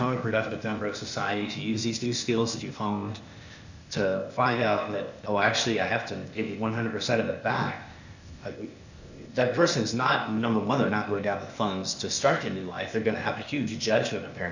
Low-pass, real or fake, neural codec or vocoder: 7.2 kHz; fake; codec, 16 kHz, 0.8 kbps, ZipCodec